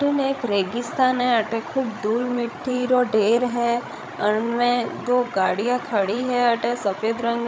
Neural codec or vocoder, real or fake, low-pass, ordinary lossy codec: codec, 16 kHz, 16 kbps, FreqCodec, larger model; fake; none; none